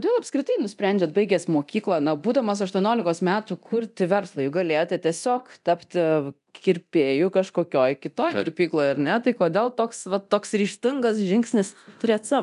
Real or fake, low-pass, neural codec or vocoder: fake; 10.8 kHz; codec, 24 kHz, 0.9 kbps, DualCodec